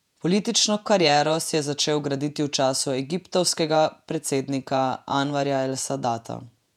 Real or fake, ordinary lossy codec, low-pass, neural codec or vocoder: fake; none; 19.8 kHz; vocoder, 48 kHz, 128 mel bands, Vocos